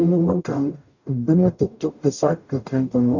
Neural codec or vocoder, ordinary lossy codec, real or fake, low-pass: codec, 44.1 kHz, 0.9 kbps, DAC; none; fake; 7.2 kHz